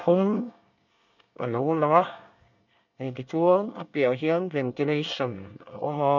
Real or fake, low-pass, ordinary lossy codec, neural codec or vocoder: fake; 7.2 kHz; none; codec, 24 kHz, 1 kbps, SNAC